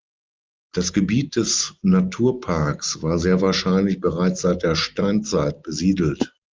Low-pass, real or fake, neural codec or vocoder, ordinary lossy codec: 7.2 kHz; real; none; Opus, 32 kbps